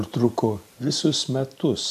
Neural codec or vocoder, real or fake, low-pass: vocoder, 44.1 kHz, 128 mel bands every 512 samples, BigVGAN v2; fake; 14.4 kHz